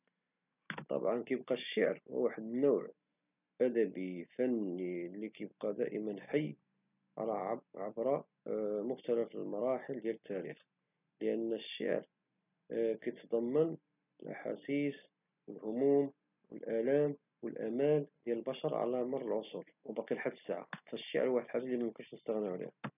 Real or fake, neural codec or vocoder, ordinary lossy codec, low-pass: real; none; none; 3.6 kHz